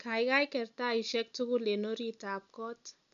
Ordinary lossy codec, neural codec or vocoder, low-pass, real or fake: MP3, 96 kbps; none; 7.2 kHz; real